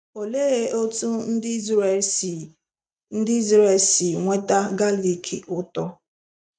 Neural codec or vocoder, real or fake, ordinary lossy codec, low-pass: none; real; none; 9.9 kHz